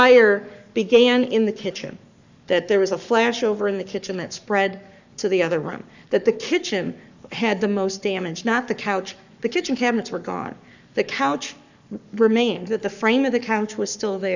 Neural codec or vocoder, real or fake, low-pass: codec, 44.1 kHz, 7.8 kbps, Pupu-Codec; fake; 7.2 kHz